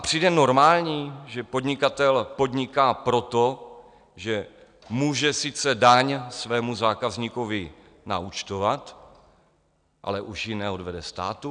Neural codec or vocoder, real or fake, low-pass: none; real; 9.9 kHz